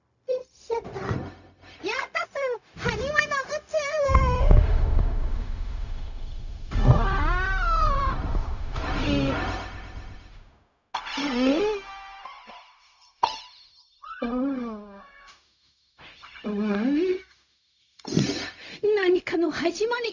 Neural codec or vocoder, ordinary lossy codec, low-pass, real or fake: codec, 16 kHz, 0.4 kbps, LongCat-Audio-Codec; none; 7.2 kHz; fake